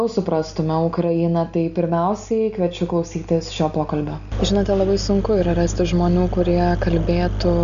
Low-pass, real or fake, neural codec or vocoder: 7.2 kHz; real; none